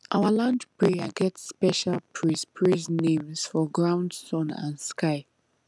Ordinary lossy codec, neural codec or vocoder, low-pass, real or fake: none; none; none; real